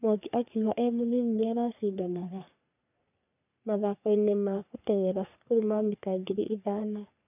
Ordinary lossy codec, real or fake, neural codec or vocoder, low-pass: none; fake; codec, 44.1 kHz, 3.4 kbps, Pupu-Codec; 3.6 kHz